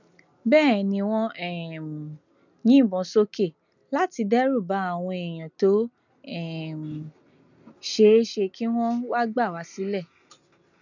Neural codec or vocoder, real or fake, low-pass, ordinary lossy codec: none; real; 7.2 kHz; none